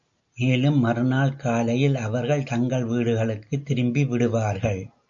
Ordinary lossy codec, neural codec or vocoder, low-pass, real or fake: AAC, 64 kbps; none; 7.2 kHz; real